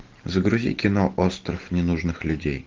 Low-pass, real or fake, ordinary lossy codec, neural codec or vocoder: 7.2 kHz; real; Opus, 32 kbps; none